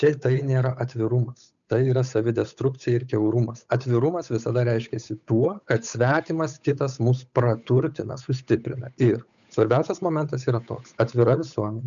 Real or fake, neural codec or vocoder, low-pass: fake; codec, 16 kHz, 8 kbps, FunCodec, trained on Chinese and English, 25 frames a second; 7.2 kHz